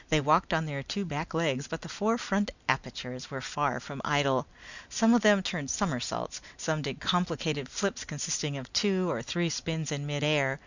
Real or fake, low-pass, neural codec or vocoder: real; 7.2 kHz; none